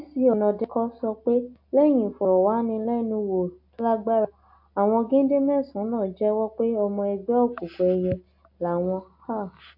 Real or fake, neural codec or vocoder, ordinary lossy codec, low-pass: real; none; AAC, 48 kbps; 5.4 kHz